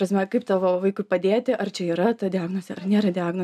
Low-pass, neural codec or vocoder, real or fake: 14.4 kHz; none; real